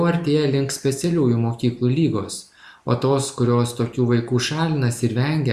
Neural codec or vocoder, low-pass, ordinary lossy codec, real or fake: none; 14.4 kHz; Opus, 64 kbps; real